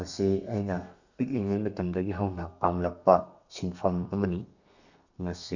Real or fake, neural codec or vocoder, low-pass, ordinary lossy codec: fake; codec, 32 kHz, 1.9 kbps, SNAC; 7.2 kHz; none